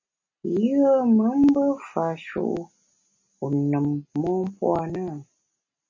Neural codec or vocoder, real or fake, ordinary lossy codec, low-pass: none; real; MP3, 32 kbps; 7.2 kHz